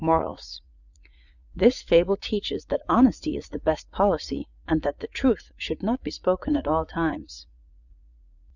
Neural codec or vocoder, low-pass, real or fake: vocoder, 44.1 kHz, 80 mel bands, Vocos; 7.2 kHz; fake